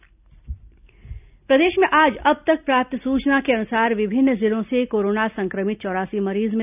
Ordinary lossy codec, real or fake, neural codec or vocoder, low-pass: none; real; none; 3.6 kHz